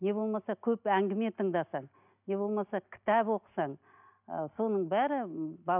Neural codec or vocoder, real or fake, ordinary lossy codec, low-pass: none; real; none; 3.6 kHz